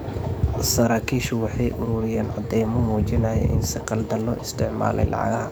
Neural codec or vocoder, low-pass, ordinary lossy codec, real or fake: codec, 44.1 kHz, 7.8 kbps, DAC; none; none; fake